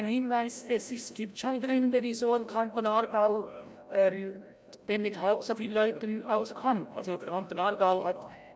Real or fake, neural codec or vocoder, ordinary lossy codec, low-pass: fake; codec, 16 kHz, 0.5 kbps, FreqCodec, larger model; none; none